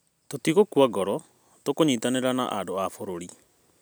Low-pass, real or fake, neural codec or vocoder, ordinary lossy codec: none; real; none; none